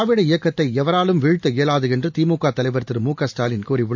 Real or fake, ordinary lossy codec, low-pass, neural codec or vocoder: real; MP3, 64 kbps; 7.2 kHz; none